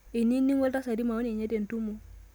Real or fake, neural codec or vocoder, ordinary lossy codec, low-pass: real; none; none; none